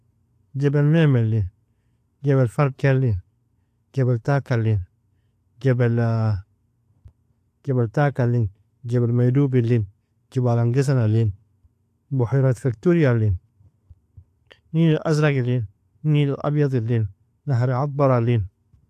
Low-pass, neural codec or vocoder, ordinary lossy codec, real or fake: 14.4 kHz; none; AAC, 64 kbps; real